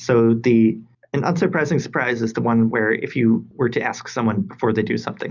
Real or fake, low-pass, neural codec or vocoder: real; 7.2 kHz; none